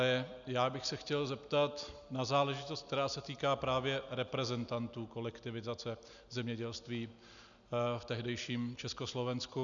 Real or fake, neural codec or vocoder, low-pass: real; none; 7.2 kHz